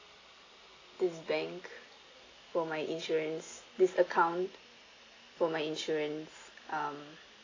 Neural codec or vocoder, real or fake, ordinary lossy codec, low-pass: none; real; AAC, 32 kbps; 7.2 kHz